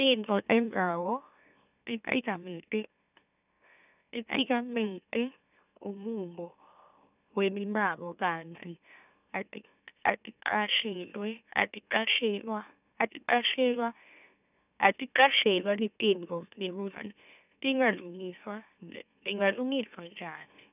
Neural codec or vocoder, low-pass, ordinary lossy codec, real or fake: autoencoder, 44.1 kHz, a latent of 192 numbers a frame, MeloTTS; 3.6 kHz; none; fake